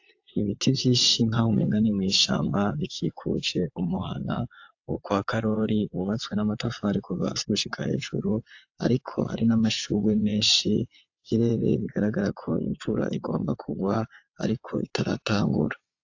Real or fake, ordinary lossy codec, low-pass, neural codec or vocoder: fake; AAC, 48 kbps; 7.2 kHz; vocoder, 22.05 kHz, 80 mel bands, WaveNeXt